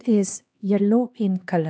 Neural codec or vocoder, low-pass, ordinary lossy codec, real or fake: codec, 16 kHz, 2 kbps, X-Codec, HuBERT features, trained on LibriSpeech; none; none; fake